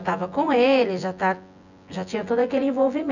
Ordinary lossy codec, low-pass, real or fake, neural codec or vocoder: none; 7.2 kHz; fake; vocoder, 24 kHz, 100 mel bands, Vocos